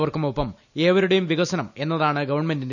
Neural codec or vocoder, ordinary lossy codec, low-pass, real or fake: none; none; 7.2 kHz; real